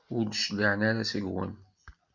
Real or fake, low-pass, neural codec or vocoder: real; 7.2 kHz; none